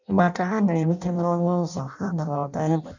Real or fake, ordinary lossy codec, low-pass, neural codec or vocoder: fake; none; 7.2 kHz; codec, 16 kHz in and 24 kHz out, 0.6 kbps, FireRedTTS-2 codec